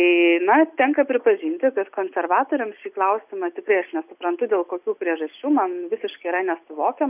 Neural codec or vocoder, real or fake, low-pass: none; real; 3.6 kHz